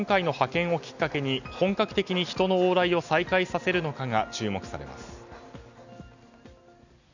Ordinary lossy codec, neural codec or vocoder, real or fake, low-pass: none; none; real; 7.2 kHz